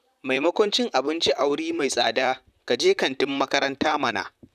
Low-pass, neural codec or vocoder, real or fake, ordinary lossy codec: 14.4 kHz; vocoder, 44.1 kHz, 128 mel bands, Pupu-Vocoder; fake; none